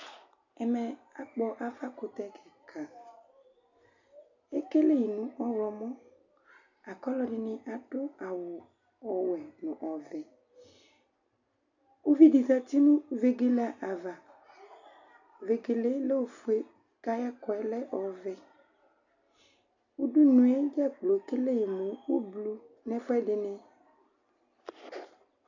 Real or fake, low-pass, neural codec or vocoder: real; 7.2 kHz; none